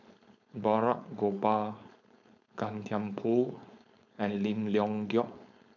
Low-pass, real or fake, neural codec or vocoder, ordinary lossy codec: 7.2 kHz; fake; codec, 16 kHz, 4.8 kbps, FACodec; none